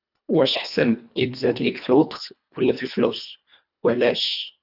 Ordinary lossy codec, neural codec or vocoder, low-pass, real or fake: none; codec, 24 kHz, 1.5 kbps, HILCodec; 5.4 kHz; fake